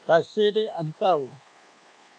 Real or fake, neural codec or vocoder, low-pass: fake; codec, 24 kHz, 1.2 kbps, DualCodec; 9.9 kHz